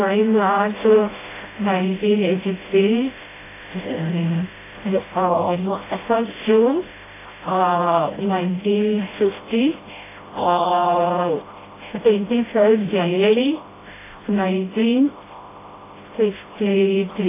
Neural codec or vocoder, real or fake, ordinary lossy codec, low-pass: codec, 16 kHz, 0.5 kbps, FreqCodec, smaller model; fake; AAC, 16 kbps; 3.6 kHz